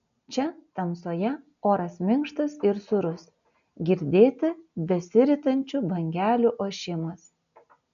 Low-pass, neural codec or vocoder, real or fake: 7.2 kHz; none; real